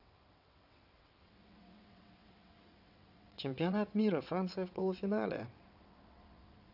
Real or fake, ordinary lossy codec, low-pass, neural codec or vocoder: fake; none; 5.4 kHz; vocoder, 22.05 kHz, 80 mel bands, WaveNeXt